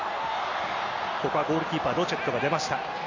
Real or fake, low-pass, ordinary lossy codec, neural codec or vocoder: real; 7.2 kHz; AAC, 48 kbps; none